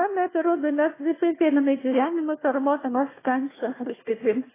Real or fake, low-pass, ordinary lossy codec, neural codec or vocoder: fake; 3.6 kHz; AAC, 16 kbps; codec, 16 kHz, 1 kbps, FunCodec, trained on Chinese and English, 50 frames a second